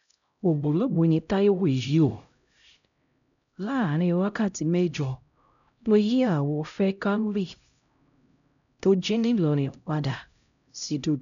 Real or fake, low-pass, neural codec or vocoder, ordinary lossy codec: fake; 7.2 kHz; codec, 16 kHz, 0.5 kbps, X-Codec, HuBERT features, trained on LibriSpeech; none